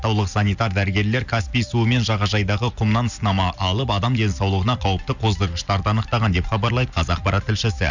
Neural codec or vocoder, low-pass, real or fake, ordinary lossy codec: none; 7.2 kHz; real; none